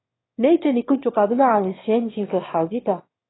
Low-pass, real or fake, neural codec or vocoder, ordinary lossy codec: 7.2 kHz; fake; autoencoder, 22.05 kHz, a latent of 192 numbers a frame, VITS, trained on one speaker; AAC, 16 kbps